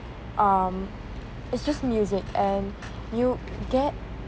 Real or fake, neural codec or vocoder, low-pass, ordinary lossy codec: real; none; none; none